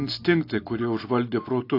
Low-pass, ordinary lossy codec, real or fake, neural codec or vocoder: 5.4 kHz; AAC, 32 kbps; real; none